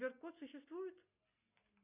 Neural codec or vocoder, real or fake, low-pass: none; real; 3.6 kHz